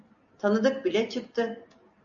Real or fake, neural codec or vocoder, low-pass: real; none; 7.2 kHz